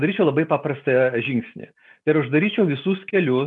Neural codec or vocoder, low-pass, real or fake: none; 10.8 kHz; real